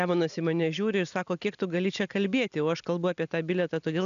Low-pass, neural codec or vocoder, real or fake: 7.2 kHz; none; real